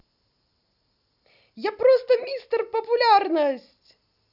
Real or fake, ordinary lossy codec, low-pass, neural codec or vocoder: real; none; 5.4 kHz; none